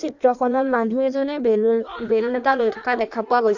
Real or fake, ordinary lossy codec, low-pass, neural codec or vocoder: fake; none; 7.2 kHz; codec, 16 kHz in and 24 kHz out, 1.1 kbps, FireRedTTS-2 codec